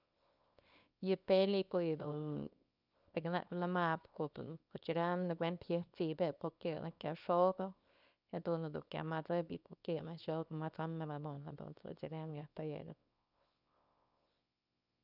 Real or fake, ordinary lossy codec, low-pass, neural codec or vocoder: fake; none; 5.4 kHz; codec, 24 kHz, 0.9 kbps, WavTokenizer, small release